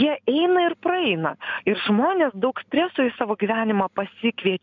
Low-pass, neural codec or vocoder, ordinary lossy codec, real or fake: 7.2 kHz; none; MP3, 64 kbps; real